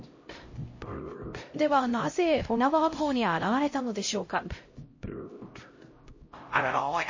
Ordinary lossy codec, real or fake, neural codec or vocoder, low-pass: MP3, 32 kbps; fake; codec, 16 kHz, 0.5 kbps, X-Codec, HuBERT features, trained on LibriSpeech; 7.2 kHz